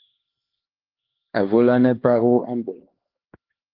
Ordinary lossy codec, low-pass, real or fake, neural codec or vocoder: Opus, 16 kbps; 5.4 kHz; fake; codec, 16 kHz, 2 kbps, X-Codec, HuBERT features, trained on LibriSpeech